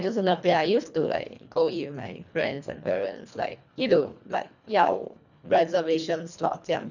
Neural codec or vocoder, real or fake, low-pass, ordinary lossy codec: codec, 24 kHz, 1.5 kbps, HILCodec; fake; 7.2 kHz; none